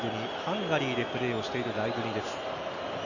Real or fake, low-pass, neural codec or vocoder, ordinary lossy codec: real; 7.2 kHz; none; none